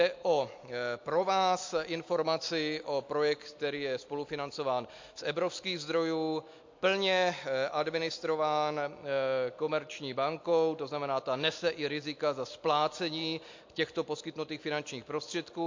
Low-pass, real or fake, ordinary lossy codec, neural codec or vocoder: 7.2 kHz; real; MP3, 48 kbps; none